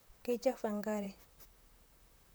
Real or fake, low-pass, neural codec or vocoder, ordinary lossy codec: fake; none; vocoder, 44.1 kHz, 128 mel bands, Pupu-Vocoder; none